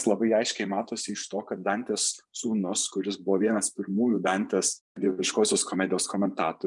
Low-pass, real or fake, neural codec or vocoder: 10.8 kHz; fake; vocoder, 24 kHz, 100 mel bands, Vocos